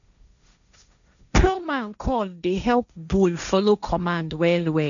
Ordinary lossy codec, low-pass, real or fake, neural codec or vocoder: none; 7.2 kHz; fake; codec, 16 kHz, 1.1 kbps, Voila-Tokenizer